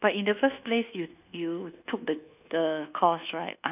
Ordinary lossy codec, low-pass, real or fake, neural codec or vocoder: none; 3.6 kHz; fake; codec, 24 kHz, 1.2 kbps, DualCodec